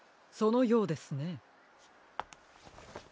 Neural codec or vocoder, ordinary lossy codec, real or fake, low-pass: none; none; real; none